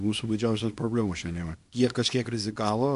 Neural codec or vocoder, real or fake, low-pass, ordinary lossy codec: codec, 24 kHz, 0.9 kbps, WavTokenizer, small release; fake; 10.8 kHz; AAC, 64 kbps